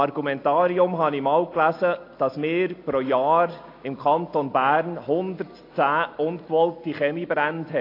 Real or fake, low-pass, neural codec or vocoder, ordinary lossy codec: real; 5.4 kHz; none; AAC, 32 kbps